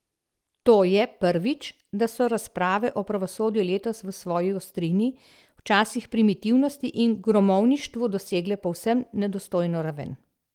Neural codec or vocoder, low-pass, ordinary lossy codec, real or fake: none; 19.8 kHz; Opus, 24 kbps; real